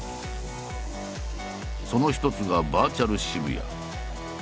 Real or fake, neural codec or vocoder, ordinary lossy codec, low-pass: real; none; none; none